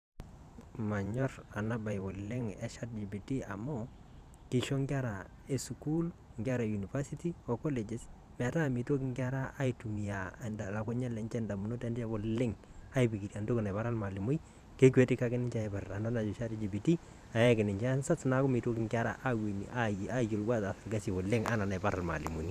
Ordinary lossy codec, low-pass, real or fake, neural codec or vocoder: none; 14.4 kHz; fake; vocoder, 44.1 kHz, 128 mel bands every 512 samples, BigVGAN v2